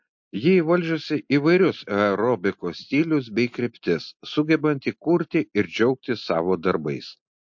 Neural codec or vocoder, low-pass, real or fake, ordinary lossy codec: none; 7.2 kHz; real; MP3, 48 kbps